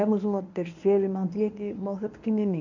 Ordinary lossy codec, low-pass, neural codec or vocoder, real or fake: none; 7.2 kHz; codec, 24 kHz, 0.9 kbps, WavTokenizer, medium speech release version 1; fake